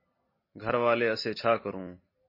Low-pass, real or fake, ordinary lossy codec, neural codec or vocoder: 5.4 kHz; real; MP3, 24 kbps; none